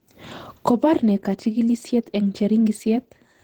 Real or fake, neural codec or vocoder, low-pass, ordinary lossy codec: fake; vocoder, 48 kHz, 128 mel bands, Vocos; 19.8 kHz; Opus, 16 kbps